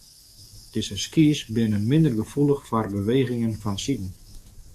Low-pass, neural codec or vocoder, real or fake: 14.4 kHz; codec, 44.1 kHz, 7.8 kbps, DAC; fake